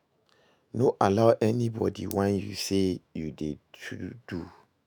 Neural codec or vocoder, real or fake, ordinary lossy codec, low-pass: autoencoder, 48 kHz, 128 numbers a frame, DAC-VAE, trained on Japanese speech; fake; none; none